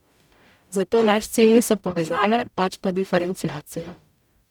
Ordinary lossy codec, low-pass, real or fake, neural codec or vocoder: none; 19.8 kHz; fake; codec, 44.1 kHz, 0.9 kbps, DAC